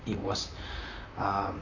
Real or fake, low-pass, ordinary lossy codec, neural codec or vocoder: fake; 7.2 kHz; none; vocoder, 44.1 kHz, 128 mel bands, Pupu-Vocoder